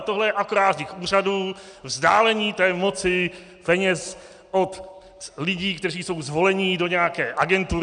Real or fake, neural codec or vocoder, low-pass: real; none; 9.9 kHz